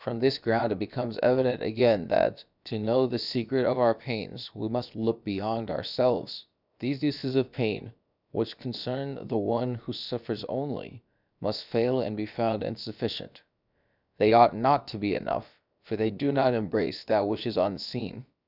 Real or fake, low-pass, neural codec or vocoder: fake; 5.4 kHz; codec, 16 kHz, about 1 kbps, DyCAST, with the encoder's durations